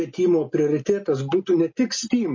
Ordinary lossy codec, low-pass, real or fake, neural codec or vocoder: MP3, 32 kbps; 7.2 kHz; real; none